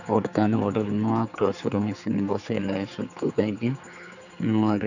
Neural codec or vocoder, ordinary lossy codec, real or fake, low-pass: codec, 16 kHz, 4 kbps, X-Codec, HuBERT features, trained on general audio; none; fake; 7.2 kHz